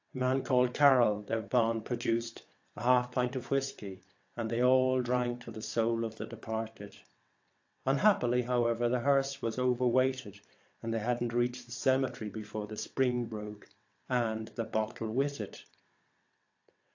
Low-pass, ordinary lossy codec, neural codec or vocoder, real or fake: 7.2 kHz; AAC, 48 kbps; vocoder, 22.05 kHz, 80 mel bands, WaveNeXt; fake